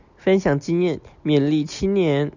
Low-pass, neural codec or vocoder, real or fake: 7.2 kHz; none; real